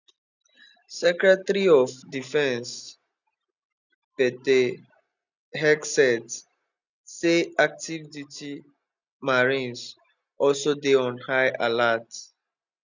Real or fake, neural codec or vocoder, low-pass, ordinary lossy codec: real; none; 7.2 kHz; none